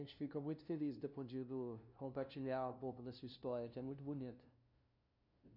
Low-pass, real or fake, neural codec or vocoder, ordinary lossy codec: 5.4 kHz; fake; codec, 16 kHz, 0.5 kbps, FunCodec, trained on LibriTTS, 25 frames a second; none